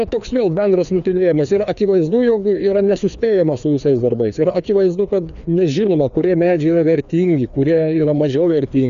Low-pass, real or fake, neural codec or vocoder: 7.2 kHz; fake; codec, 16 kHz, 2 kbps, FreqCodec, larger model